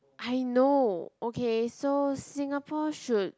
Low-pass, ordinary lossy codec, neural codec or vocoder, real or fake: none; none; none; real